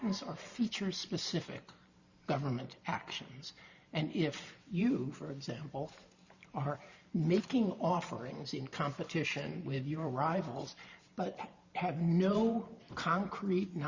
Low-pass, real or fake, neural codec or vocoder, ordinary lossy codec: 7.2 kHz; fake; vocoder, 44.1 kHz, 128 mel bands every 512 samples, BigVGAN v2; Opus, 64 kbps